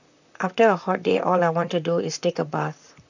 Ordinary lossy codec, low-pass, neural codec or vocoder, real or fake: none; 7.2 kHz; vocoder, 44.1 kHz, 128 mel bands, Pupu-Vocoder; fake